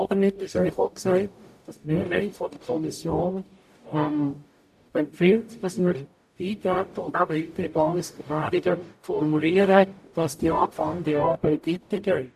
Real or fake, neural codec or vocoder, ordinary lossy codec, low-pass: fake; codec, 44.1 kHz, 0.9 kbps, DAC; AAC, 96 kbps; 14.4 kHz